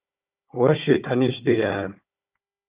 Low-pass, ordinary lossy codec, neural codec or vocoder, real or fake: 3.6 kHz; Opus, 64 kbps; codec, 16 kHz, 4 kbps, FunCodec, trained on Chinese and English, 50 frames a second; fake